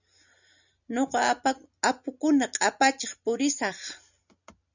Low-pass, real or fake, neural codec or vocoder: 7.2 kHz; real; none